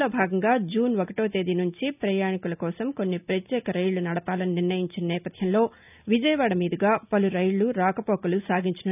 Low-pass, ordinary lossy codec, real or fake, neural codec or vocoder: 3.6 kHz; none; real; none